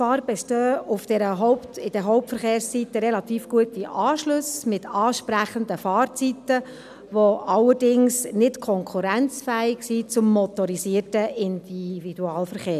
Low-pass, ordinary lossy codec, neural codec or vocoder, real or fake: 14.4 kHz; none; none; real